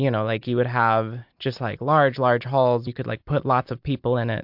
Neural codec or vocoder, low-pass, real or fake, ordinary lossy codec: none; 5.4 kHz; real; MP3, 48 kbps